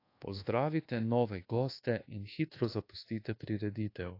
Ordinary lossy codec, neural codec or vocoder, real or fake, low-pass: AAC, 32 kbps; codec, 24 kHz, 1.2 kbps, DualCodec; fake; 5.4 kHz